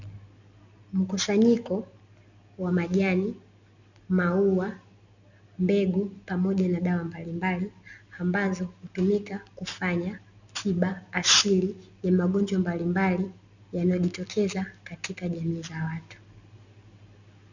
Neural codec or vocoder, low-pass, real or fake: none; 7.2 kHz; real